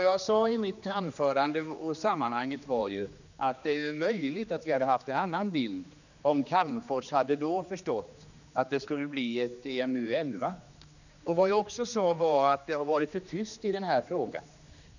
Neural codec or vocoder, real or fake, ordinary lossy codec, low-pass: codec, 16 kHz, 2 kbps, X-Codec, HuBERT features, trained on general audio; fake; none; 7.2 kHz